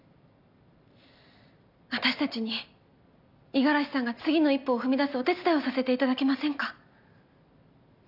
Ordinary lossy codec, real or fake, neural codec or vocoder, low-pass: none; real; none; 5.4 kHz